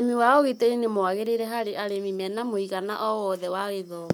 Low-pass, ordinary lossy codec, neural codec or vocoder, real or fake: none; none; codec, 44.1 kHz, 7.8 kbps, Pupu-Codec; fake